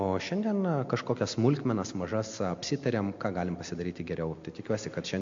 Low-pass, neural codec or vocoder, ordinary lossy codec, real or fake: 7.2 kHz; none; MP3, 48 kbps; real